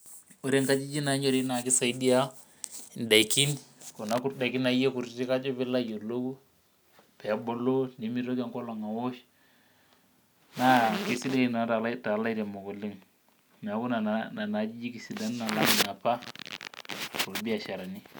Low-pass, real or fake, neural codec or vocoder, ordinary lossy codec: none; real; none; none